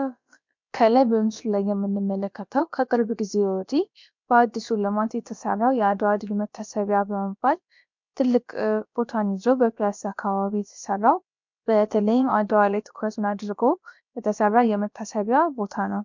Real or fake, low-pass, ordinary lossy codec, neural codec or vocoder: fake; 7.2 kHz; MP3, 64 kbps; codec, 16 kHz, about 1 kbps, DyCAST, with the encoder's durations